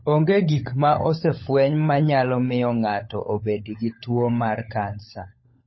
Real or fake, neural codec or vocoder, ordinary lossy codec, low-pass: fake; codec, 16 kHz, 16 kbps, FunCodec, trained on LibriTTS, 50 frames a second; MP3, 24 kbps; 7.2 kHz